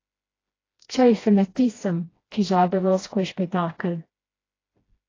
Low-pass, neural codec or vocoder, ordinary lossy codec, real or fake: 7.2 kHz; codec, 16 kHz, 1 kbps, FreqCodec, smaller model; AAC, 32 kbps; fake